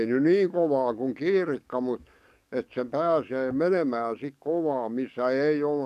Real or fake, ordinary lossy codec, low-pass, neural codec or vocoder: fake; none; 14.4 kHz; codec, 44.1 kHz, 7.8 kbps, DAC